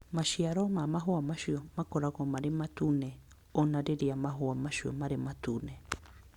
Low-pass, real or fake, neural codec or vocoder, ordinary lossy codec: 19.8 kHz; real; none; Opus, 64 kbps